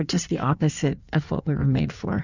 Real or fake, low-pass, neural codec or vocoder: fake; 7.2 kHz; codec, 16 kHz in and 24 kHz out, 1.1 kbps, FireRedTTS-2 codec